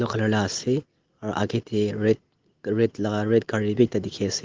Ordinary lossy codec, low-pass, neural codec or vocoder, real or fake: Opus, 16 kbps; 7.2 kHz; none; real